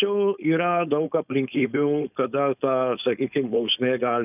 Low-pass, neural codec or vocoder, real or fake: 3.6 kHz; codec, 16 kHz, 4.8 kbps, FACodec; fake